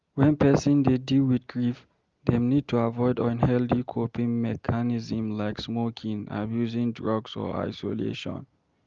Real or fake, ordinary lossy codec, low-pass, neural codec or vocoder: real; Opus, 32 kbps; 7.2 kHz; none